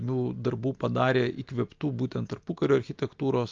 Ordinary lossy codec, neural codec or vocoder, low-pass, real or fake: Opus, 24 kbps; none; 7.2 kHz; real